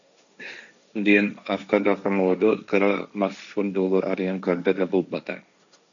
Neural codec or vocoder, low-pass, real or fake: codec, 16 kHz, 1.1 kbps, Voila-Tokenizer; 7.2 kHz; fake